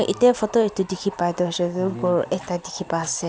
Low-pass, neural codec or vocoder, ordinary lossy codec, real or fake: none; none; none; real